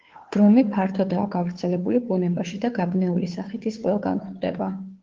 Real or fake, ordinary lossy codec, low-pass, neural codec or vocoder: fake; Opus, 16 kbps; 7.2 kHz; codec, 16 kHz, 2 kbps, FunCodec, trained on Chinese and English, 25 frames a second